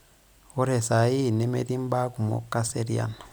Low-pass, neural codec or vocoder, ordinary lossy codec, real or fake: none; vocoder, 44.1 kHz, 128 mel bands every 256 samples, BigVGAN v2; none; fake